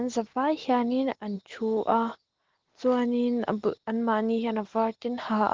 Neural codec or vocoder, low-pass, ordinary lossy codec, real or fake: none; 7.2 kHz; Opus, 16 kbps; real